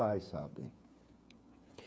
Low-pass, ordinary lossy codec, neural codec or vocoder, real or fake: none; none; codec, 16 kHz, 8 kbps, FreqCodec, smaller model; fake